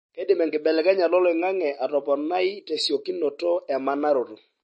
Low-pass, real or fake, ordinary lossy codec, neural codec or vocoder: 10.8 kHz; real; MP3, 32 kbps; none